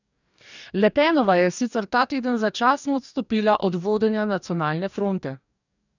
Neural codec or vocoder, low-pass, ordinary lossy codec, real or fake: codec, 44.1 kHz, 2.6 kbps, DAC; 7.2 kHz; none; fake